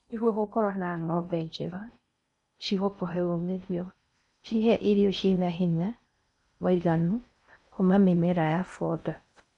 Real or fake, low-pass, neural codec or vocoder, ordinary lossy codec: fake; 10.8 kHz; codec, 16 kHz in and 24 kHz out, 0.6 kbps, FocalCodec, streaming, 4096 codes; none